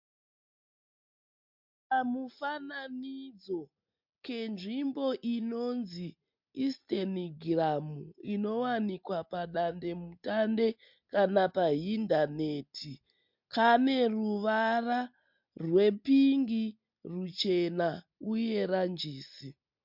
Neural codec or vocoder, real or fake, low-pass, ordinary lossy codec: none; real; 5.4 kHz; MP3, 48 kbps